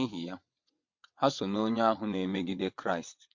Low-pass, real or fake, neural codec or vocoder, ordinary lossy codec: 7.2 kHz; fake; vocoder, 22.05 kHz, 80 mel bands, WaveNeXt; MP3, 48 kbps